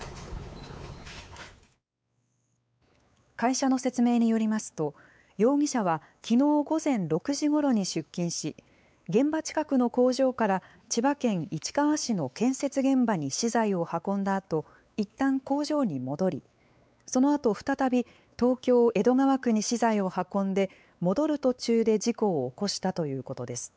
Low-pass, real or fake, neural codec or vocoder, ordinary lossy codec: none; fake; codec, 16 kHz, 4 kbps, X-Codec, WavLM features, trained on Multilingual LibriSpeech; none